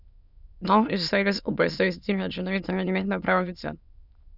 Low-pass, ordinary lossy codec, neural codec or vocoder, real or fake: 5.4 kHz; none; autoencoder, 22.05 kHz, a latent of 192 numbers a frame, VITS, trained on many speakers; fake